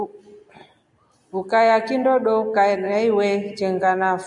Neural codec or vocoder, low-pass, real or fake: none; 10.8 kHz; real